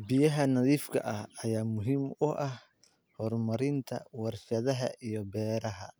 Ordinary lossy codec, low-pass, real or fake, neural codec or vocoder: none; none; real; none